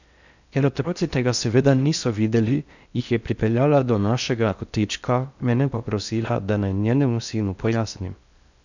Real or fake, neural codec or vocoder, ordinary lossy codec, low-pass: fake; codec, 16 kHz in and 24 kHz out, 0.6 kbps, FocalCodec, streaming, 4096 codes; none; 7.2 kHz